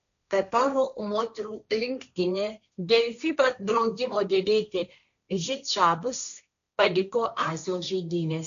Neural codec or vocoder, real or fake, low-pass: codec, 16 kHz, 1.1 kbps, Voila-Tokenizer; fake; 7.2 kHz